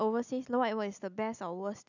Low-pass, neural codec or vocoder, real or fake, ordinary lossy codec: 7.2 kHz; none; real; none